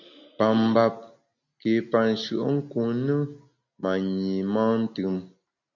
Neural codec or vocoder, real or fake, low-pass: none; real; 7.2 kHz